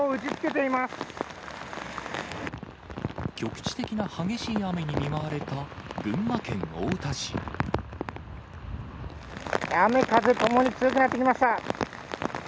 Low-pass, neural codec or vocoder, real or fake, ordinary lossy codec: none; none; real; none